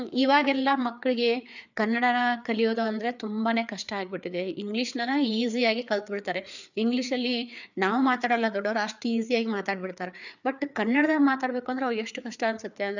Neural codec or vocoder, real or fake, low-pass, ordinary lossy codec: codec, 16 kHz, 4 kbps, FreqCodec, larger model; fake; 7.2 kHz; none